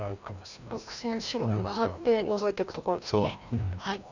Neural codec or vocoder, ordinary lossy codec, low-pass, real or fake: codec, 16 kHz, 1 kbps, FreqCodec, larger model; none; 7.2 kHz; fake